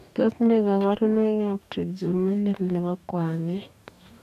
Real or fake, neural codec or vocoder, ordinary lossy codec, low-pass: fake; codec, 44.1 kHz, 2.6 kbps, DAC; none; 14.4 kHz